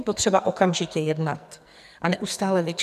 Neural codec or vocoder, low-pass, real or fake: codec, 44.1 kHz, 2.6 kbps, SNAC; 14.4 kHz; fake